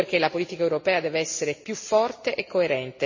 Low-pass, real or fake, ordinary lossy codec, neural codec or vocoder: 7.2 kHz; real; MP3, 32 kbps; none